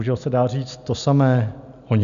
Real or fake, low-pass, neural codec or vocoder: real; 7.2 kHz; none